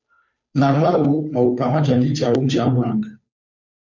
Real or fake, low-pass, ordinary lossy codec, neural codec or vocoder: fake; 7.2 kHz; MP3, 64 kbps; codec, 16 kHz, 2 kbps, FunCodec, trained on Chinese and English, 25 frames a second